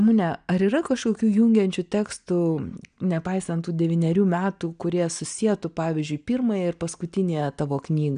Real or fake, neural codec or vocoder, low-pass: real; none; 9.9 kHz